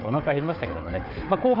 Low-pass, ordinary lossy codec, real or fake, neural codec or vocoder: 5.4 kHz; AAC, 32 kbps; fake; codec, 16 kHz, 4 kbps, FunCodec, trained on Chinese and English, 50 frames a second